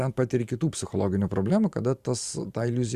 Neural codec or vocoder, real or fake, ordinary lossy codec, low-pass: vocoder, 44.1 kHz, 128 mel bands every 512 samples, BigVGAN v2; fake; Opus, 64 kbps; 14.4 kHz